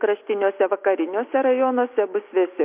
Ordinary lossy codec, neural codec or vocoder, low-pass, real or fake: MP3, 32 kbps; none; 3.6 kHz; real